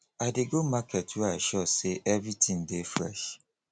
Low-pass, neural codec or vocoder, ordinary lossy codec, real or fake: none; none; none; real